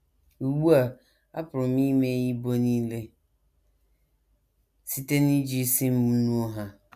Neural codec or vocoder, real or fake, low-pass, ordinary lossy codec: none; real; 14.4 kHz; none